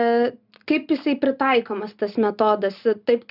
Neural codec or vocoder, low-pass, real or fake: none; 5.4 kHz; real